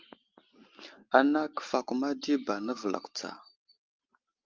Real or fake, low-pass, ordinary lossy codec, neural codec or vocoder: real; 7.2 kHz; Opus, 24 kbps; none